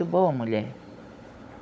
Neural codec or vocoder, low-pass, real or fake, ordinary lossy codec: codec, 16 kHz, 16 kbps, FunCodec, trained on Chinese and English, 50 frames a second; none; fake; none